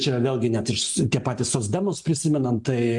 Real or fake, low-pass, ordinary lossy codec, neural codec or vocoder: real; 10.8 kHz; MP3, 64 kbps; none